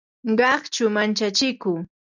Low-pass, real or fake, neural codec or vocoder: 7.2 kHz; real; none